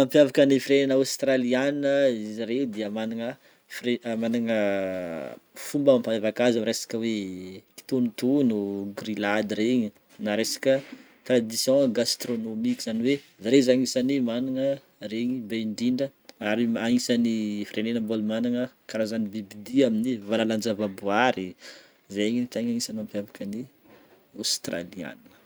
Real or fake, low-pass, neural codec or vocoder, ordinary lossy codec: real; none; none; none